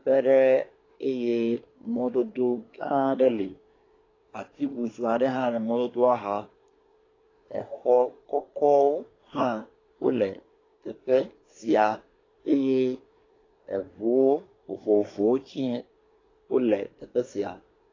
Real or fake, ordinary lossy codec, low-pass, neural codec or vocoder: fake; AAC, 32 kbps; 7.2 kHz; codec, 24 kHz, 1 kbps, SNAC